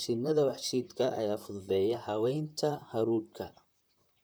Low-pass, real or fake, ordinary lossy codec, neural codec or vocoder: none; fake; none; vocoder, 44.1 kHz, 128 mel bands, Pupu-Vocoder